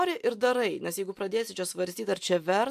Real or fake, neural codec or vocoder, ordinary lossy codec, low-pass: real; none; AAC, 96 kbps; 14.4 kHz